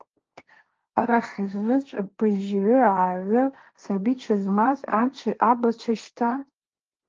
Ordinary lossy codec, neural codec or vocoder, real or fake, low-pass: Opus, 24 kbps; codec, 16 kHz, 1.1 kbps, Voila-Tokenizer; fake; 7.2 kHz